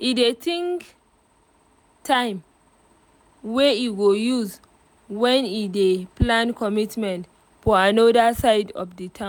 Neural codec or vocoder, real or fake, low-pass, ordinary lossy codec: none; real; none; none